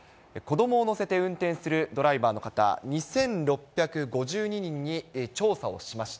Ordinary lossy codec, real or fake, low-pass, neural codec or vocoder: none; real; none; none